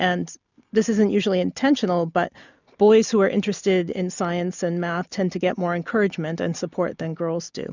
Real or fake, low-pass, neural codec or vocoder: real; 7.2 kHz; none